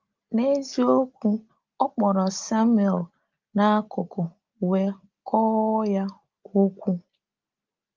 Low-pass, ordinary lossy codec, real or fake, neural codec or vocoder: 7.2 kHz; Opus, 32 kbps; real; none